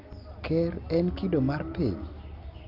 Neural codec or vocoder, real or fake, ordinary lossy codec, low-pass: none; real; Opus, 32 kbps; 5.4 kHz